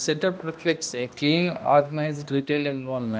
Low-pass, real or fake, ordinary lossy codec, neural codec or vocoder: none; fake; none; codec, 16 kHz, 1 kbps, X-Codec, HuBERT features, trained on balanced general audio